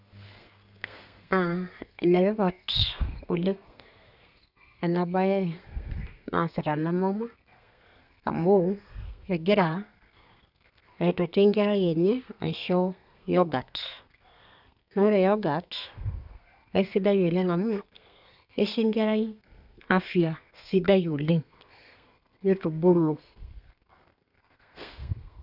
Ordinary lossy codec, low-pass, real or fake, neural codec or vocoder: none; 5.4 kHz; fake; codec, 32 kHz, 1.9 kbps, SNAC